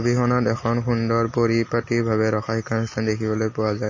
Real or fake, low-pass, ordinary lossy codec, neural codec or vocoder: real; 7.2 kHz; MP3, 32 kbps; none